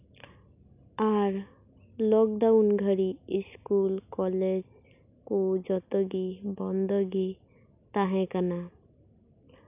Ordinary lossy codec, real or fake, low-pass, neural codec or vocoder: none; real; 3.6 kHz; none